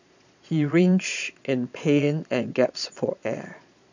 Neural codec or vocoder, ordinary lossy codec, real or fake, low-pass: vocoder, 22.05 kHz, 80 mel bands, Vocos; none; fake; 7.2 kHz